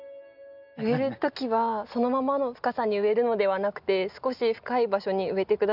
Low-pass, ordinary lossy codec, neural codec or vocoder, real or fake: 5.4 kHz; none; none; real